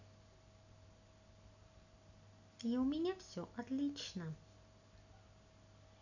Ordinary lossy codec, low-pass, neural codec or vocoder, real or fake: none; 7.2 kHz; none; real